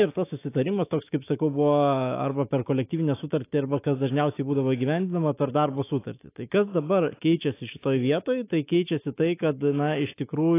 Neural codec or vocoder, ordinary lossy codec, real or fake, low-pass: autoencoder, 48 kHz, 128 numbers a frame, DAC-VAE, trained on Japanese speech; AAC, 24 kbps; fake; 3.6 kHz